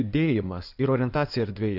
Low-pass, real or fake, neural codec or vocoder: 5.4 kHz; fake; codec, 16 kHz in and 24 kHz out, 2.2 kbps, FireRedTTS-2 codec